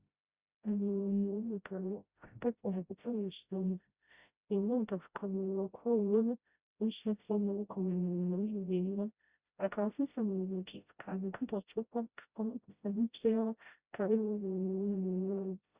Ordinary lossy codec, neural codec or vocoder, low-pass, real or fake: Opus, 64 kbps; codec, 16 kHz, 0.5 kbps, FreqCodec, smaller model; 3.6 kHz; fake